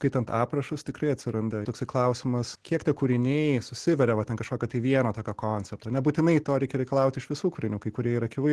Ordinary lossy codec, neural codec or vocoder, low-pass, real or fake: Opus, 16 kbps; none; 10.8 kHz; real